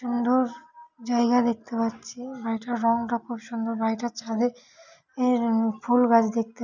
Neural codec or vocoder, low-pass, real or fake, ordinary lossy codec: none; 7.2 kHz; real; none